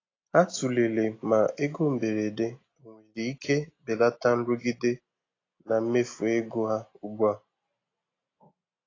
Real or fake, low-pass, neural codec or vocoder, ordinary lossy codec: real; 7.2 kHz; none; AAC, 32 kbps